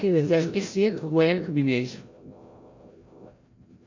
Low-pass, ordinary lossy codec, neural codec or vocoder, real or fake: 7.2 kHz; MP3, 48 kbps; codec, 16 kHz, 0.5 kbps, FreqCodec, larger model; fake